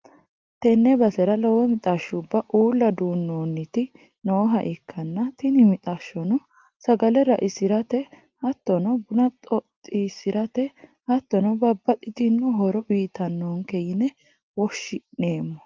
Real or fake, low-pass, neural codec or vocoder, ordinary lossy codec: real; 7.2 kHz; none; Opus, 32 kbps